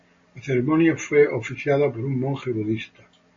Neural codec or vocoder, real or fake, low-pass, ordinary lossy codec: none; real; 7.2 kHz; MP3, 32 kbps